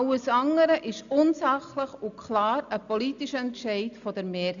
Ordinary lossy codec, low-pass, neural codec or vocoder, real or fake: MP3, 96 kbps; 7.2 kHz; none; real